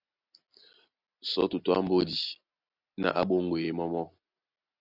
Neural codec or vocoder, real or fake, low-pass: none; real; 5.4 kHz